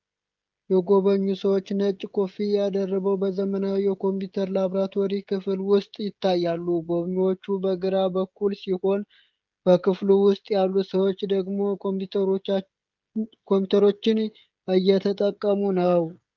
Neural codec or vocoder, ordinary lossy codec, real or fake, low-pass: codec, 16 kHz, 16 kbps, FreqCodec, smaller model; Opus, 32 kbps; fake; 7.2 kHz